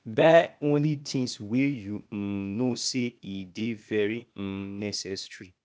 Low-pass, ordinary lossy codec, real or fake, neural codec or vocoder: none; none; fake; codec, 16 kHz, 0.8 kbps, ZipCodec